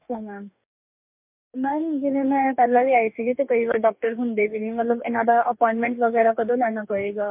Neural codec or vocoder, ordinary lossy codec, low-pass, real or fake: codec, 44.1 kHz, 2.6 kbps, DAC; MP3, 32 kbps; 3.6 kHz; fake